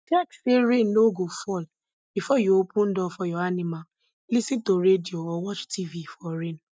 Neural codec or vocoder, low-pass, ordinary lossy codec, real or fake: none; none; none; real